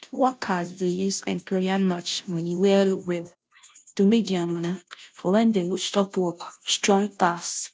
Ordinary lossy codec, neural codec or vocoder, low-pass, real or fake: none; codec, 16 kHz, 0.5 kbps, FunCodec, trained on Chinese and English, 25 frames a second; none; fake